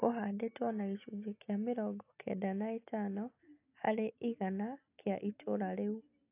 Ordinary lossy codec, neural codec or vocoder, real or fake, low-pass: AAC, 32 kbps; none; real; 3.6 kHz